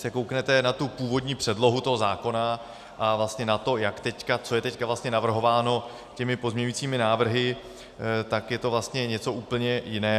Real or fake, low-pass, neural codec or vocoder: real; 14.4 kHz; none